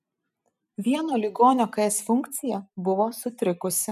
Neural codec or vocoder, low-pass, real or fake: vocoder, 44.1 kHz, 128 mel bands every 256 samples, BigVGAN v2; 14.4 kHz; fake